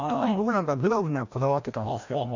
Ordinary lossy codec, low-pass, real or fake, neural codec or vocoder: none; 7.2 kHz; fake; codec, 16 kHz, 1 kbps, FreqCodec, larger model